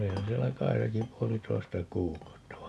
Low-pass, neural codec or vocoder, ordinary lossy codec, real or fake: none; none; none; real